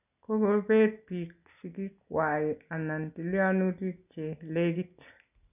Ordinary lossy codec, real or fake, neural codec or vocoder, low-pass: none; real; none; 3.6 kHz